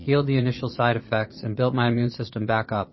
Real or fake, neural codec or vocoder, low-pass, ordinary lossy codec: fake; vocoder, 22.05 kHz, 80 mel bands, Vocos; 7.2 kHz; MP3, 24 kbps